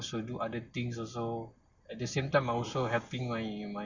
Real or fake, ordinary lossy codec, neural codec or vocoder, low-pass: real; Opus, 64 kbps; none; 7.2 kHz